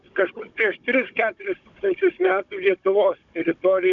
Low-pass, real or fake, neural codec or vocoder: 7.2 kHz; fake; codec, 16 kHz, 16 kbps, FunCodec, trained on Chinese and English, 50 frames a second